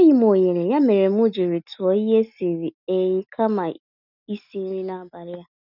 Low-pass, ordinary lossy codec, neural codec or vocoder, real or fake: 5.4 kHz; none; none; real